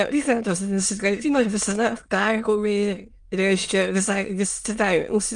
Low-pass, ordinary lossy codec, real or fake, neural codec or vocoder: 9.9 kHz; Opus, 32 kbps; fake; autoencoder, 22.05 kHz, a latent of 192 numbers a frame, VITS, trained on many speakers